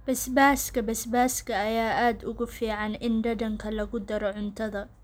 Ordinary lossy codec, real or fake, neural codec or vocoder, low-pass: none; real; none; none